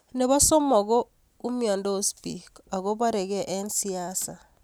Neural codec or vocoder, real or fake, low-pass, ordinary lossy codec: none; real; none; none